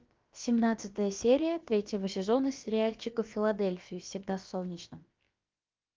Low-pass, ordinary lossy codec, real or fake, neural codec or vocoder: 7.2 kHz; Opus, 32 kbps; fake; codec, 16 kHz, about 1 kbps, DyCAST, with the encoder's durations